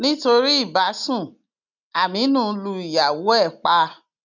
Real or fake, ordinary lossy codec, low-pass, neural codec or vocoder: real; none; 7.2 kHz; none